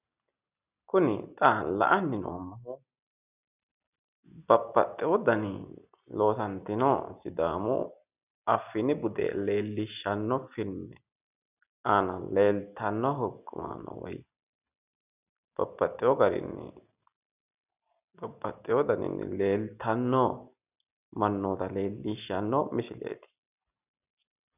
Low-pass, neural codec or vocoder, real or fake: 3.6 kHz; none; real